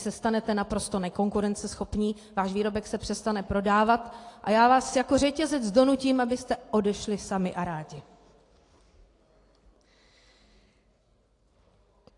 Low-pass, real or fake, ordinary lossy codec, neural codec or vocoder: 10.8 kHz; real; AAC, 48 kbps; none